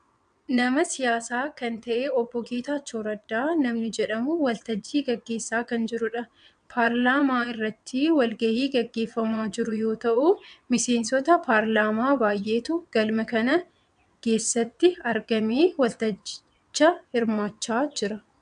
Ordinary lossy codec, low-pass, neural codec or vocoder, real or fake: AAC, 96 kbps; 9.9 kHz; vocoder, 22.05 kHz, 80 mel bands, WaveNeXt; fake